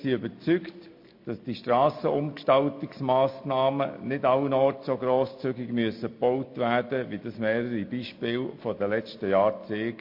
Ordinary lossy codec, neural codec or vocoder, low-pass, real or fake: MP3, 32 kbps; none; 5.4 kHz; real